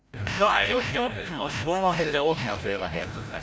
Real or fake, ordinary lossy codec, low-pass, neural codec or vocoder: fake; none; none; codec, 16 kHz, 0.5 kbps, FreqCodec, larger model